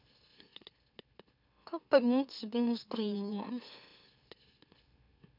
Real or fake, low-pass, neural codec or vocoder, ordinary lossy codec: fake; 5.4 kHz; autoencoder, 44.1 kHz, a latent of 192 numbers a frame, MeloTTS; none